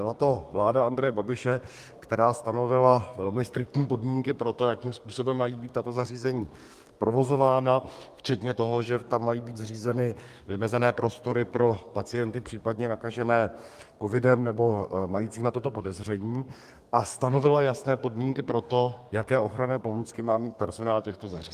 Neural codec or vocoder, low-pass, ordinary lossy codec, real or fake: codec, 32 kHz, 1.9 kbps, SNAC; 14.4 kHz; Opus, 32 kbps; fake